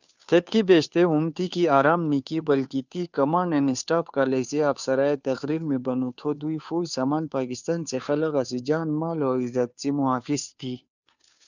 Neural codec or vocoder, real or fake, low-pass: codec, 16 kHz, 2 kbps, FunCodec, trained on Chinese and English, 25 frames a second; fake; 7.2 kHz